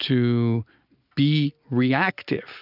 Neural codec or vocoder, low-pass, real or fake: none; 5.4 kHz; real